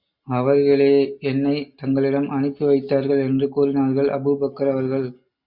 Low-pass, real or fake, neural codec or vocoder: 5.4 kHz; real; none